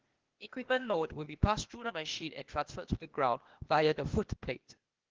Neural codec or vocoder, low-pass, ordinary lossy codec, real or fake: codec, 16 kHz, 0.8 kbps, ZipCodec; 7.2 kHz; Opus, 16 kbps; fake